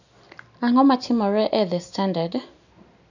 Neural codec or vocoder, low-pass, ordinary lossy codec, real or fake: none; 7.2 kHz; none; real